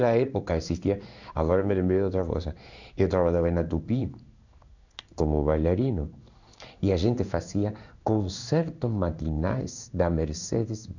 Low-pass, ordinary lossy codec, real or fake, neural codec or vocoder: 7.2 kHz; none; fake; codec, 16 kHz in and 24 kHz out, 1 kbps, XY-Tokenizer